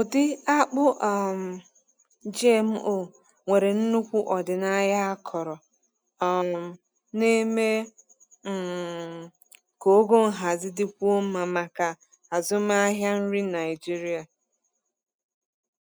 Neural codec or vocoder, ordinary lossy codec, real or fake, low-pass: none; none; real; none